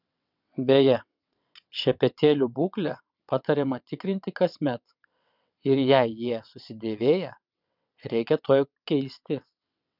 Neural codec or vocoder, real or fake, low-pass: vocoder, 22.05 kHz, 80 mel bands, WaveNeXt; fake; 5.4 kHz